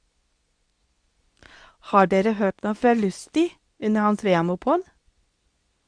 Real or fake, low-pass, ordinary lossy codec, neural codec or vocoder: fake; 9.9 kHz; Opus, 64 kbps; codec, 24 kHz, 0.9 kbps, WavTokenizer, medium speech release version 2